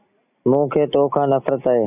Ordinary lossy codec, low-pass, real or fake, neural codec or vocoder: AAC, 24 kbps; 3.6 kHz; real; none